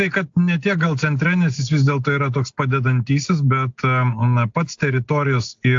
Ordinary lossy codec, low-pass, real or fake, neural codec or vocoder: AAC, 64 kbps; 7.2 kHz; real; none